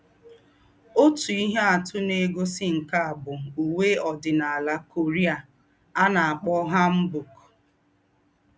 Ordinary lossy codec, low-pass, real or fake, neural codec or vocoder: none; none; real; none